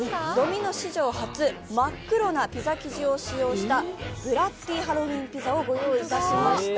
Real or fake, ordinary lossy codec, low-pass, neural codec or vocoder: real; none; none; none